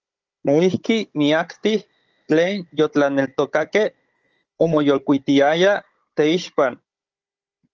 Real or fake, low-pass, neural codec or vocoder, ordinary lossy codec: fake; 7.2 kHz; codec, 16 kHz, 16 kbps, FunCodec, trained on Chinese and English, 50 frames a second; Opus, 24 kbps